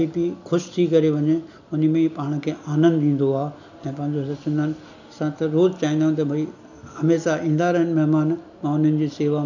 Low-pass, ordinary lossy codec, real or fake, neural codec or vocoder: 7.2 kHz; none; real; none